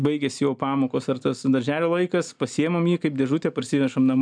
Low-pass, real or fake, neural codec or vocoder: 9.9 kHz; real; none